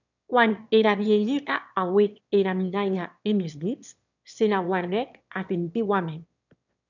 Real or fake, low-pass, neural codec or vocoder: fake; 7.2 kHz; autoencoder, 22.05 kHz, a latent of 192 numbers a frame, VITS, trained on one speaker